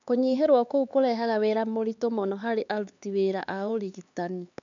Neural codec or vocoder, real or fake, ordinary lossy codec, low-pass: codec, 16 kHz, 4 kbps, X-Codec, HuBERT features, trained on LibriSpeech; fake; none; 7.2 kHz